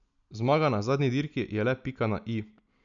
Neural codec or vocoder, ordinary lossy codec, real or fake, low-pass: none; none; real; 7.2 kHz